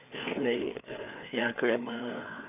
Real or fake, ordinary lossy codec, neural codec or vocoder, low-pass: fake; none; codec, 16 kHz, 4 kbps, FreqCodec, larger model; 3.6 kHz